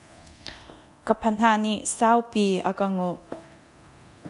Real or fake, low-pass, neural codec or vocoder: fake; 10.8 kHz; codec, 24 kHz, 0.9 kbps, DualCodec